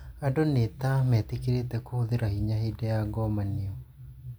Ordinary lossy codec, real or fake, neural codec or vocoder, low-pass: none; real; none; none